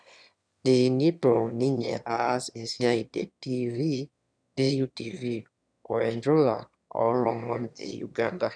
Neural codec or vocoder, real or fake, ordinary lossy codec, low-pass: autoencoder, 22.05 kHz, a latent of 192 numbers a frame, VITS, trained on one speaker; fake; none; 9.9 kHz